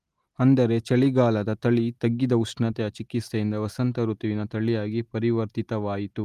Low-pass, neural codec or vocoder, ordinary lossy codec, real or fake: 14.4 kHz; none; Opus, 32 kbps; real